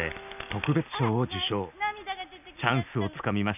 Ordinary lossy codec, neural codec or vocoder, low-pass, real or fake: none; none; 3.6 kHz; real